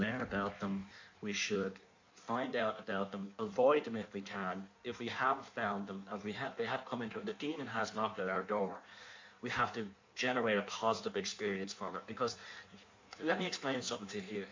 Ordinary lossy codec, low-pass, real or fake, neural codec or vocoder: MP3, 48 kbps; 7.2 kHz; fake; codec, 16 kHz in and 24 kHz out, 1.1 kbps, FireRedTTS-2 codec